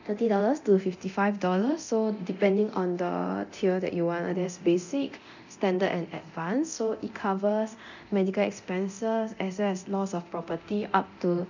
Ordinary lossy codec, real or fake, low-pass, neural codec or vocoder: none; fake; 7.2 kHz; codec, 24 kHz, 0.9 kbps, DualCodec